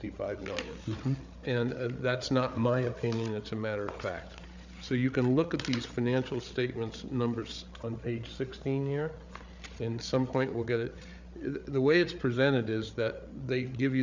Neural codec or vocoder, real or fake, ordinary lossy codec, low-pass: codec, 16 kHz, 8 kbps, FreqCodec, larger model; fake; Opus, 64 kbps; 7.2 kHz